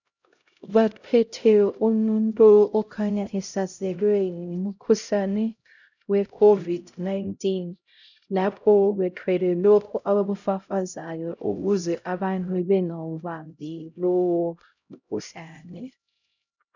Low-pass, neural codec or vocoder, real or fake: 7.2 kHz; codec, 16 kHz, 0.5 kbps, X-Codec, HuBERT features, trained on LibriSpeech; fake